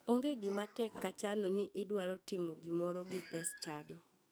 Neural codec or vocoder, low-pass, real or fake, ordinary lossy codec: codec, 44.1 kHz, 2.6 kbps, SNAC; none; fake; none